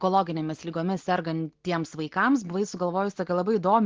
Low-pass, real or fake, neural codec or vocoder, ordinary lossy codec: 7.2 kHz; real; none; Opus, 16 kbps